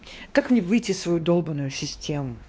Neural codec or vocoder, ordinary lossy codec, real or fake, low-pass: codec, 16 kHz, 2 kbps, X-Codec, WavLM features, trained on Multilingual LibriSpeech; none; fake; none